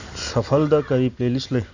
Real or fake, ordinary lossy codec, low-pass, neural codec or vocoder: real; Opus, 64 kbps; 7.2 kHz; none